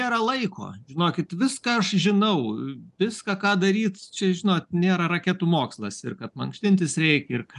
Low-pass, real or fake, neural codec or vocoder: 10.8 kHz; real; none